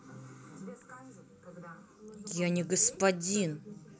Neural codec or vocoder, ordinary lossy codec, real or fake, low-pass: none; none; real; none